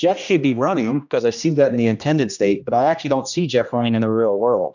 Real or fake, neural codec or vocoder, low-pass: fake; codec, 16 kHz, 1 kbps, X-Codec, HuBERT features, trained on general audio; 7.2 kHz